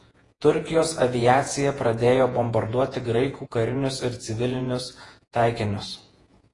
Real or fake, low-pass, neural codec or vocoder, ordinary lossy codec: fake; 10.8 kHz; vocoder, 48 kHz, 128 mel bands, Vocos; AAC, 32 kbps